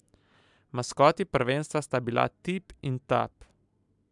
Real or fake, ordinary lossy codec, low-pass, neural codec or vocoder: real; MP3, 96 kbps; 10.8 kHz; none